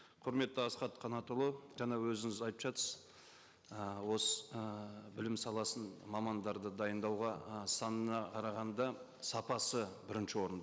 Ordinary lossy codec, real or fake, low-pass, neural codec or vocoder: none; real; none; none